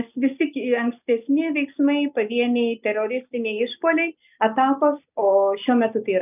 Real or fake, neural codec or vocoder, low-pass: real; none; 3.6 kHz